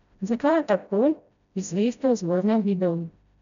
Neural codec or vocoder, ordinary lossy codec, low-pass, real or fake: codec, 16 kHz, 0.5 kbps, FreqCodec, smaller model; none; 7.2 kHz; fake